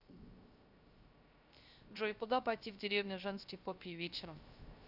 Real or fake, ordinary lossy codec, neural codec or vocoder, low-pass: fake; none; codec, 16 kHz, 0.3 kbps, FocalCodec; 5.4 kHz